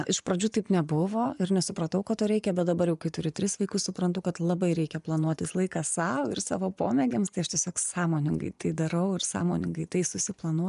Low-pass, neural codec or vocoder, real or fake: 10.8 kHz; vocoder, 24 kHz, 100 mel bands, Vocos; fake